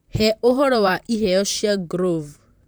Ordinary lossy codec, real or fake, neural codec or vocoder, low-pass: none; fake; vocoder, 44.1 kHz, 128 mel bands, Pupu-Vocoder; none